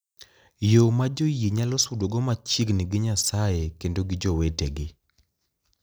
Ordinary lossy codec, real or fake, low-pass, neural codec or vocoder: none; real; none; none